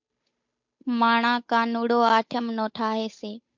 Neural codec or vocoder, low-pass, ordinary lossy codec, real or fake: codec, 16 kHz, 8 kbps, FunCodec, trained on Chinese and English, 25 frames a second; 7.2 kHz; MP3, 48 kbps; fake